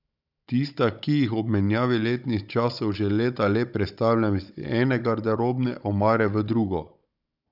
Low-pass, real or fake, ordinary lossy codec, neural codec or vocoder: 5.4 kHz; fake; none; codec, 16 kHz, 16 kbps, FunCodec, trained on Chinese and English, 50 frames a second